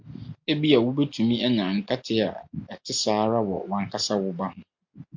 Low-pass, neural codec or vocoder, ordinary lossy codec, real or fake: 7.2 kHz; none; MP3, 64 kbps; real